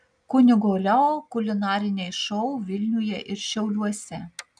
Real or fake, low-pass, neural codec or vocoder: real; 9.9 kHz; none